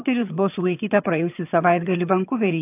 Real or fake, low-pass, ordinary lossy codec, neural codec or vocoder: fake; 3.6 kHz; AAC, 32 kbps; vocoder, 22.05 kHz, 80 mel bands, HiFi-GAN